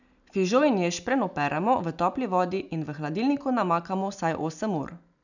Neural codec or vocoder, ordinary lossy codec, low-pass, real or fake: none; none; 7.2 kHz; real